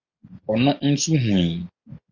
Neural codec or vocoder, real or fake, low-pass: none; real; 7.2 kHz